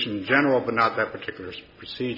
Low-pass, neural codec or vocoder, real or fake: 5.4 kHz; none; real